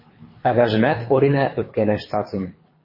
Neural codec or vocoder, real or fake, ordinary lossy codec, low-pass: codec, 24 kHz, 3 kbps, HILCodec; fake; MP3, 24 kbps; 5.4 kHz